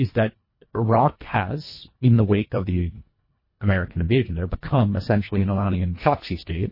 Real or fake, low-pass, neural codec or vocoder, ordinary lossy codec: fake; 5.4 kHz; codec, 24 kHz, 1.5 kbps, HILCodec; MP3, 24 kbps